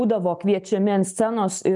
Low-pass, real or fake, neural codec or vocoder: 10.8 kHz; real; none